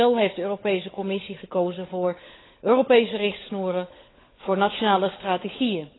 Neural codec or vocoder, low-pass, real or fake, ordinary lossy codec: codec, 16 kHz, 16 kbps, FunCodec, trained on LibriTTS, 50 frames a second; 7.2 kHz; fake; AAC, 16 kbps